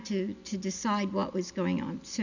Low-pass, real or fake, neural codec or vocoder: 7.2 kHz; real; none